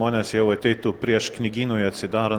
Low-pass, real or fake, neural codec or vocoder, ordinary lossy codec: 19.8 kHz; real; none; Opus, 16 kbps